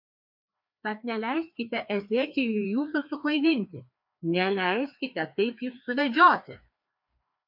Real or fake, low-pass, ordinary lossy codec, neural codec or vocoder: fake; 5.4 kHz; MP3, 48 kbps; codec, 16 kHz, 2 kbps, FreqCodec, larger model